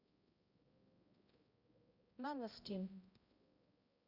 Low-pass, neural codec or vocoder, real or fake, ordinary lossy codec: 5.4 kHz; codec, 16 kHz, 0.5 kbps, X-Codec, HuBERT features, trained on balanced general audio; fake; Opus, 64 kbps